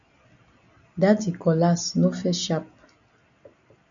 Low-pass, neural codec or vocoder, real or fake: 7.2 kHz; none; real